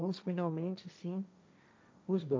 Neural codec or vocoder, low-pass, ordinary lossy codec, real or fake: codec, 16 kHz, 1.1 kbps, Voila-Tokenizer; none; none; fake